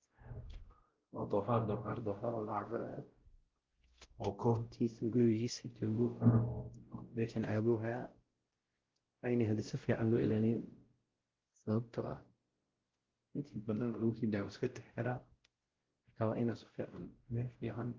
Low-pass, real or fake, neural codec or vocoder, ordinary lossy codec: 7.2 kHz; fake; codec, 16 kHz, 0.5 kbps, X-Codec, WavLM features, trained on Multilingual LibriSpeech; Opus, 16 kbps